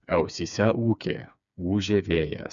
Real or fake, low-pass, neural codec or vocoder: fake; 7.2 kHz; codec, 16 kHz, 4 kbps, FreqCodec, smaller model